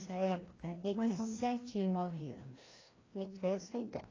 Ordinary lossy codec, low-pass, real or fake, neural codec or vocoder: AAC, 32 kbps; 7.2 kHz; fake; codec, 16 kHz, 1 kbps, FreqCodec, larger model